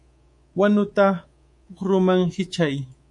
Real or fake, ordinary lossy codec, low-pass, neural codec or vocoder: fake; MP3, 48 kbps; 10.8 kHz; codec, 24 kHz, 3.1 kbps, DualCodec